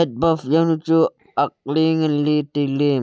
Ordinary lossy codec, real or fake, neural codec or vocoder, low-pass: none; real; none; none